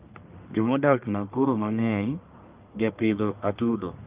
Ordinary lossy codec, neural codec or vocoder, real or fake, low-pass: Opus, 24 kbps; codec, 44.1 kHz, 1.7 kbps, Pupu-Codec; fake; 3.6 kHz